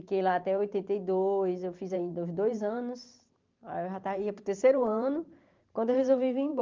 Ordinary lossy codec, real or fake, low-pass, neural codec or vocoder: Opus, 32 kbps; fake; 7.2 kHz; vocoder, 44.1 kHz, 128 mel bands every 512 samples, BigVGAN v2